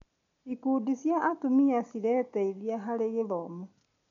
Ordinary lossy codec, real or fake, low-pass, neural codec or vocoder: none; real; 7.2 kHz; none